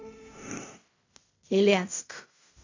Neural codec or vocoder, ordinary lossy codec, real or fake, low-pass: codec, 16 kHz in and 24 kHz out, 0.4 kbps, LongCat-Audio-Codec, fine tuned four codebook decoder; AAC, 48 kbps; fake; 7.2 kHz